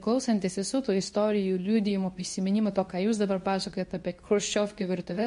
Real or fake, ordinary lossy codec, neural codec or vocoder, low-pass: fake; MP3, 48 kbps; codec, 24 kHz, 0.9 kbps, WavTokenizer, medium speech release version 2; 10.8 kHz